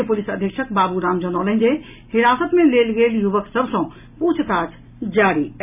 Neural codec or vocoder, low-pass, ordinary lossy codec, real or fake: none; 3.6 kHz; none; real